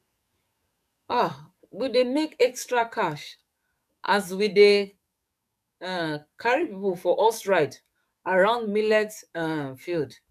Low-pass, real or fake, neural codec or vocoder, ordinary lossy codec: 14.4 kHz; fake; codec, 44.1 kHz, 7.8 kbps, DAC; none